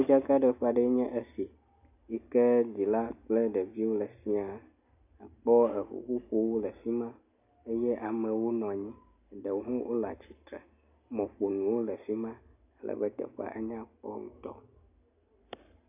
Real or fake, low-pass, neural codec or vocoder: real; 3.6 kHz; none